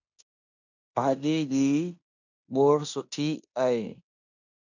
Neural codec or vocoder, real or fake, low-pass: codec, 16 kHz in and 24 kHz out, 0.9 kbps, LongCat-Audio-Codec, fine tuned four codebook decoder; fake; 7.2 kHz